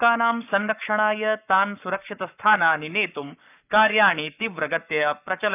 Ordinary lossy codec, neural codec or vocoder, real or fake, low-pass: none; codec, 44.1 kHz, 7.8 kbps, Pupu-Codec; fake; 3.6 kHz